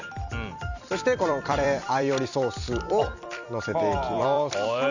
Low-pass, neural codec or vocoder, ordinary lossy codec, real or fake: 7.2 kHz; vocoder, 44.1 kHz, 128 mel bands every 256 samples, BigVGAN v2; none; fake